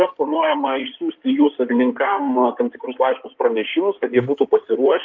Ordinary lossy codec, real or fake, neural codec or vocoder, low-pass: Opus, 24 kbps; fake; codec, 16 kHz in and 24 kHz out, 2.2 kbps, FireRedTTS-2 codec; 7.2 kHz